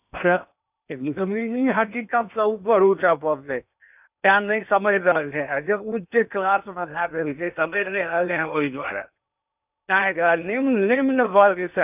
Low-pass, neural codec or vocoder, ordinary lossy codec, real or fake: 3.6 kHz; codec, 16 kHz in and 24 kHz out, 0.8 kbps, FocalCodec, streaming, 65536 codes; none; fake